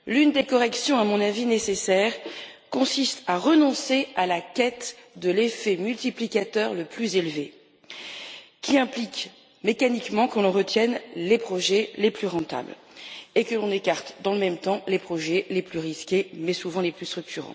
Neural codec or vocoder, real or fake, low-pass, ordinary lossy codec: none; real; none; none